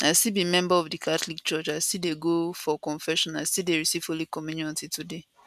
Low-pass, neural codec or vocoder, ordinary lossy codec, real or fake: 14.4 kHz; none; none; real